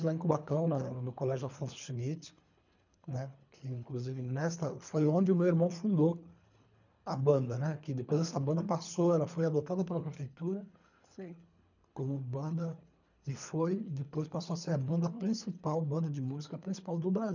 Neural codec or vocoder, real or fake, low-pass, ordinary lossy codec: codec, 24 kHz, 3 kbps, HILCodec; fake; 7.2 kHz; none